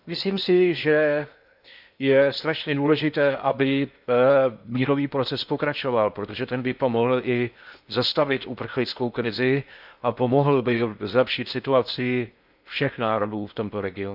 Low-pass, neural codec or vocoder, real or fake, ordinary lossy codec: 5.4 kHz; codec, 16 kHz in and 24 kHz out, 0.8 kbps, FocalCodec, streaming, 65536 codes; fake; none